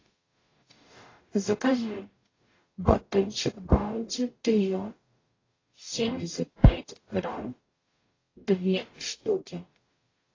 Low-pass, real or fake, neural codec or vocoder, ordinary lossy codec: 7.2 kHz; fake; codec, 44.1 kHz, 0.9 kbps, DAC; AAC, 32 kbps